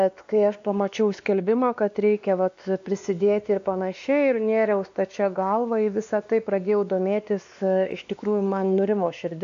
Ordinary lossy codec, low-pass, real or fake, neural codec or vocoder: AAC, 64 kbps; 7.2 kHz; fake; codec, 16 kHz, 2 kbps, X-Codec, WavLM features, trained on Multilingual LibriSpeech